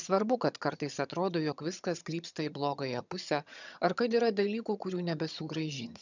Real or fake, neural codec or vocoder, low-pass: fake; vocoder, 22.05 kHz, 80 mel bands, HiFi-GAN; 7.2 kHz